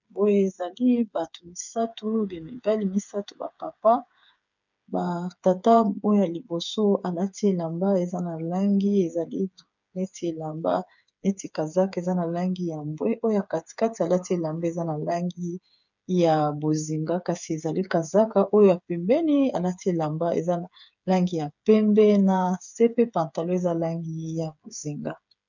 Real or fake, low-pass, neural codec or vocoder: fake; 7.2 kHz; codec, 16 kHz, 8 kbps, FreqCodec, smaller model